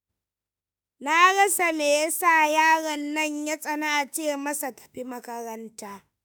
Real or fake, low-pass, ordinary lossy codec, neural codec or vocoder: fake; none; none; autoencoder, 48 kHz, 32 numbers a frame, DAC-VAE, trained on Japanese speech